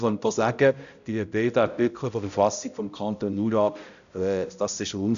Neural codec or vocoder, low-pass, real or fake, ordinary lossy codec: codec, 16 kHz, 0.5 kbps, X-Codec, HuBERT features, trained on balanced general audio; 7.2 kHz; fake; none